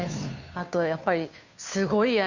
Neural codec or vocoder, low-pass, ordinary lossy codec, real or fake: codec, 16 kHz, 2 kbps, FunCodec, trained on Chinese and English, 25 frames a second; 7.2 kHz; none; fake